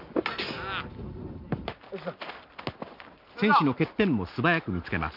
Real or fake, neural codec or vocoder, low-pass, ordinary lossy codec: real; none; 5.4 kHz; none